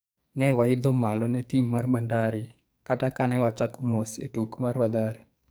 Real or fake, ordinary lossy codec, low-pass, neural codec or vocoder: fake; none; none; codec, 44.1 kHz, 2.6 kbps, SNAC